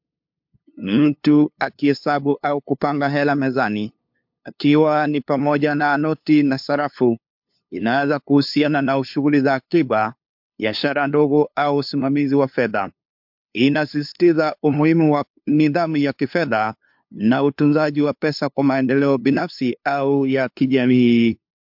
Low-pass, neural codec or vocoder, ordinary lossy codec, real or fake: 5.4 kHz; codec, 16 kHz, 2 kbps, FunCodec, trained on LibriTTS, 25 frames a second; MP3, 48 kbps; fake